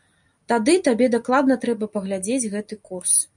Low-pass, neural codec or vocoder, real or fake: 10.8 kHz; none; real